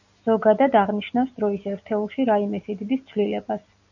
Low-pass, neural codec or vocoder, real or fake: 7.2 kHz; none; real